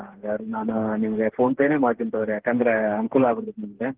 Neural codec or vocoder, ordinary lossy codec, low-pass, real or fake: codec, 16 kHz, 4 kbps, FreqCodec, smaller model; Opus, 16 kbps; 3.6 kHz; fake